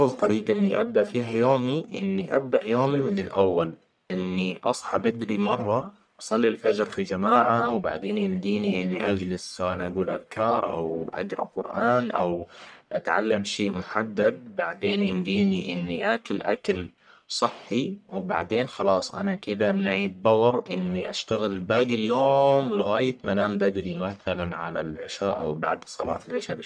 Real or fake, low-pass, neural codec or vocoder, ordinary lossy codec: fake; 9.9 kHz; codec, 44.1 kHz, 1.7 kbps, Pupu-Codec; none